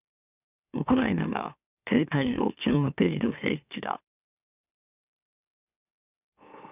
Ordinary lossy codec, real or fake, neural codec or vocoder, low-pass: none; fake; autoencoder, 44.1 kHz, a latent of 192 numbers a frame, MeloTTS; 3.6 kHz